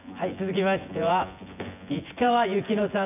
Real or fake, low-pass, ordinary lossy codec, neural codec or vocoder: fake; 3.6 kHz; none; vocoder, 24 kHz, 100 mel bands, Vocos